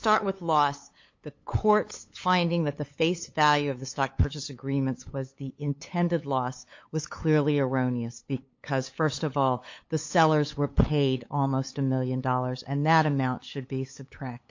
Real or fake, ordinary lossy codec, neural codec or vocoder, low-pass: fake; MP3, 48 kbps; codec, 16 kHz, 8 kbps, FunCodec, trained on LibriTTS, 25 frames a second; 7.2 kHz